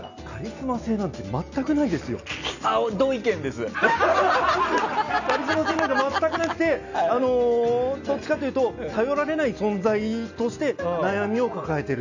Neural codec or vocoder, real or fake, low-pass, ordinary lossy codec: none; real; 7.2 kHz; none